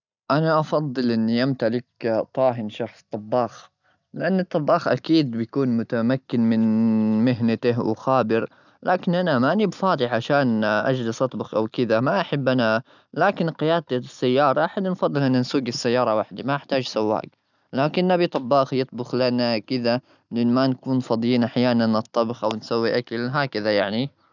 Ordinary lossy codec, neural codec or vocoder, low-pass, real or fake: none; none; 7.2 kHz; real